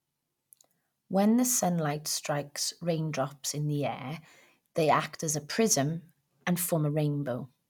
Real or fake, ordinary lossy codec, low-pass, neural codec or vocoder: real; none; 19.8 kHz; none